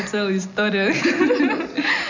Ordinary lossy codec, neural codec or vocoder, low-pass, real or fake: none; none; 7.2 kHz; real